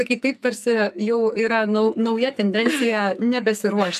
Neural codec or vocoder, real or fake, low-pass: codec, 44.1 kHz, 2.6 kbps, SNAC; fake; 14.4 kHz